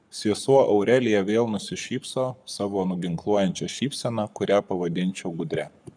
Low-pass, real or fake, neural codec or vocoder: 9.9 kHz; fake; codec, 44.1 kHz, 7.8 kbps, Pupu-Codec